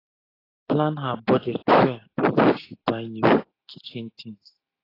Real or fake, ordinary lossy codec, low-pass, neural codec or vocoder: real; AAC, 24 kbps; 5.4 kHz; none